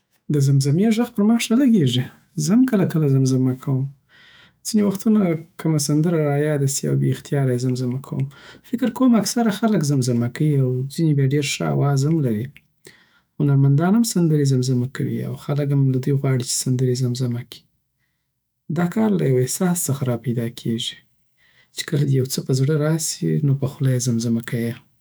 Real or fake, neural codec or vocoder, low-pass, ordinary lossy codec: fake; autoencoder, 48 kHz, 128 numbers a frame, DAC-VAE, trained on Japanese speech; none; none